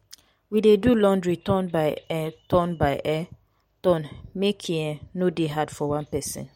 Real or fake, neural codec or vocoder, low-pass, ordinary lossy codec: real; none; 19.8 kHz; MP3, 64 kbps